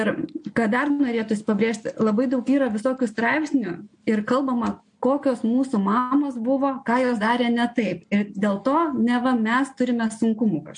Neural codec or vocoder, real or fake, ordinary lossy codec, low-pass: none; real; MP3, 48 kbps; 9.9 kHz